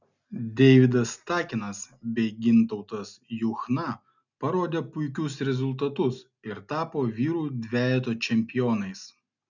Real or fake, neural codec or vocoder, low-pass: real; none; 7.2 kHz